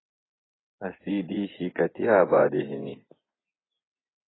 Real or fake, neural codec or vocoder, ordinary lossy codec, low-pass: fake; vocoder, 24 kHz, 100 mel bands, Vocos; AAC, 16 kbps; 7.2 kHz